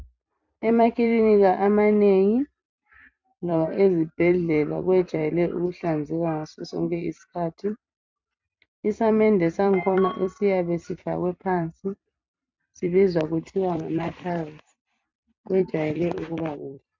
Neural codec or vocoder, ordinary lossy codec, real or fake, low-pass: vocoder, 44.1 kHz, 128 mel bands every 256 samples, BigVGAN v2; MP3, 64 kbps; fake; 7.2 kHz